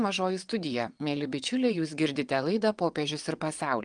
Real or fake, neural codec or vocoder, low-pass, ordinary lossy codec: fake; vocoder, 22.05 kHz, 80 mel bands, WaveNeXt; 9.9 kHz; Opus, 32 kbps